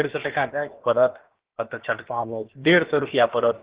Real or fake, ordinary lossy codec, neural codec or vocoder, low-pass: fake; Opus, 16 kbps; codec, 16 kHz, about 1 kbps, DyCAST, with the encoder's durations; 3.6 kHz